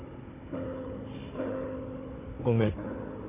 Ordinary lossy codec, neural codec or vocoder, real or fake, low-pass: MP3, 16 kbps; codec, 16 kHz, 16 kbps, FunCodec, trained on Chinese and English, 50 frames a second; fake; 3.6 kHz